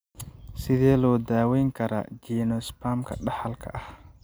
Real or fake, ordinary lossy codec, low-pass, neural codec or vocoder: real; none; none; none